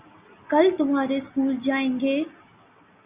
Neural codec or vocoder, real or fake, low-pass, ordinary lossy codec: none; real; 3.6 kHz; Opus, 64 kbps